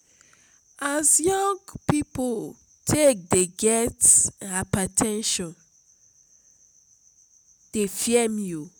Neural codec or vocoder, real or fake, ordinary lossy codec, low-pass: none; real; none; none